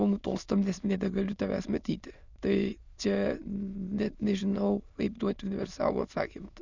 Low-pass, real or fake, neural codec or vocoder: 7.2 kHz; fake; autoencoder, 22.05 kHz, a latent of 192 numbers a frame, VITS, trained on many speakers